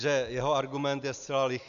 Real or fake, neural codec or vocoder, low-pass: real; none; 7.2 kHz